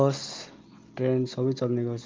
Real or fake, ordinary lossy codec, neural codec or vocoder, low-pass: real; Opus, 32 kbps; none; 7.2 kHz